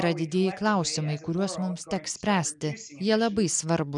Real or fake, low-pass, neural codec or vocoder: real; 10.8 kHz; none